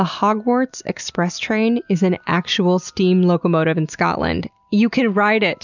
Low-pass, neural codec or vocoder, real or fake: 7.2 kHz; none; real